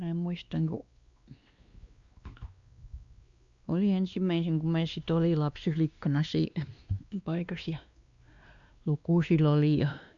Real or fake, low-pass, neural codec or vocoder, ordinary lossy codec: fake; 7.2 kHz; codec, 16 kHz, 2 kbps, X-Codec, WavLM features, trained on Multilingual LibriSpeech; Opus, 64 kbps